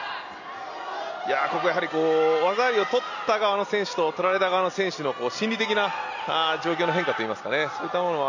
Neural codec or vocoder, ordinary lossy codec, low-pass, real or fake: none; none; 7.2 kHz; real